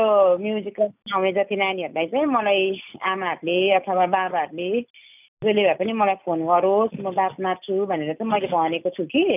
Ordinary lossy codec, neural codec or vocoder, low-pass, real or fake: none; none; 3.6 kHz; real